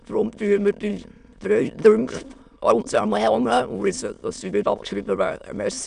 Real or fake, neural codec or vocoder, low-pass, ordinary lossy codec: fake; autoencoder, 22.05 kHz, a latent of 192 numbers a frame, VITS, trained on many speakers; 9.9 kHz; none